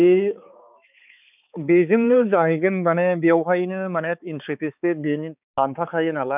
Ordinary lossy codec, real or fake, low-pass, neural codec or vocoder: none; fake; 3.6 kHz; codec, 16 kHz, 4 kbps, X-Codec, HuBERT features, trained on balanced general audio